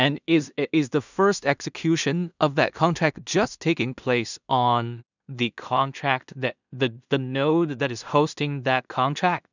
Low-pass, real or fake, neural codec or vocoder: 7.2 kHz; fake; codec, 16 kHz in and 24 kHz out, 0.4 kbps, LongCat-Audio-Codec, two codebook decoder